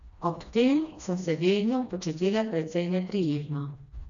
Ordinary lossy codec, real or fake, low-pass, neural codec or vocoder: none; fake; 7.2 kHz; codec, 16 kHz, 1 kbps, FreqCodec, smaller model